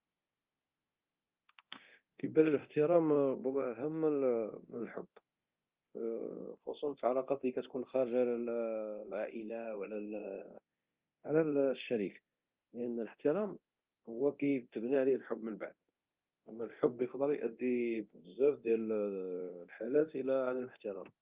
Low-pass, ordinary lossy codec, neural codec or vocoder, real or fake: 3.6 kHz; Opus, 24 kbps; codec, 24 kHz, 0.9 kbps, DualCodec; fake